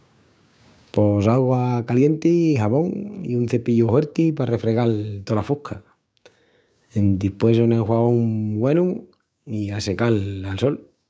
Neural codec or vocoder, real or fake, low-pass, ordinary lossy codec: codec, 16 kHz, 6 kbps, DAC; fake; none; none